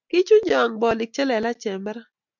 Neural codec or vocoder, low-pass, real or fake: none; 7.2 kHz; real